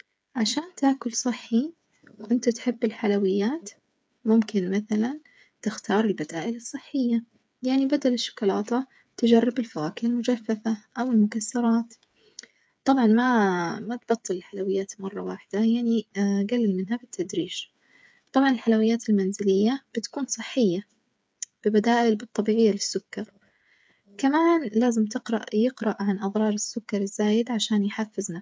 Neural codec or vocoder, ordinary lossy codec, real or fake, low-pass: codec, 16 kHz, 8 kbps, FreqCodec, smaller model; none; fake; none